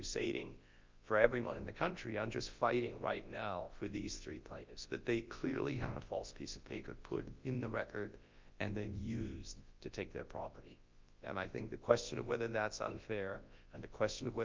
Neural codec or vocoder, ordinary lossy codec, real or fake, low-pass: codec, 24 kHz, 0.9 kbps, WavTokenizer, large speech release; Opus, 24 kbps; fake; 7.2 kHz